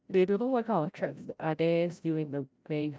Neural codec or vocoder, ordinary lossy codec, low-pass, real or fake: codec, 16 kHz, 0.5 kbps, FreqCodec, larger model; none; none; fake